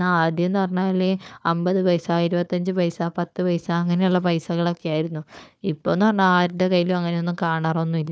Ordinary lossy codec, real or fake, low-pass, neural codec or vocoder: none; fake; none; codec, 16 kHz, 4 kbps, FunCodec, trained on Chinese and English, 50 frames a second